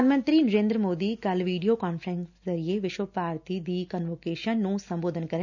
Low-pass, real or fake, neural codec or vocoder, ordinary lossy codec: 7.2 kHz; real; none; none